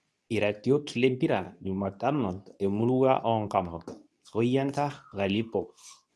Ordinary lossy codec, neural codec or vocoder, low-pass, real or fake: none; codec, 24 kHz, 0.9 kbps, WavTokenizer, medium speech release version 2; none; fake